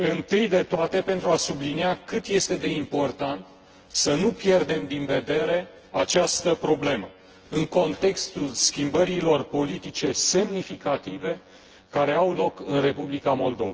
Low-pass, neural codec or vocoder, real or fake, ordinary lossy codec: 7.2 kHz; vocoder, 24 kHz, 100 mel bands, Vocos; fake; Opus, 16 kbps